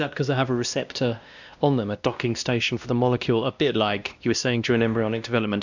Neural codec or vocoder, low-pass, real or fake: codec, 16 kHz, 1 kbps, X-Codec, WavLM features, trained on Multilingual LibriSpeech; 7.2 kHz; fake